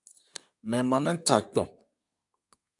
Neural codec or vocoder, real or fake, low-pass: codec, 24 kHz, 1 kbps, SNAC; fake; 10.8 kHz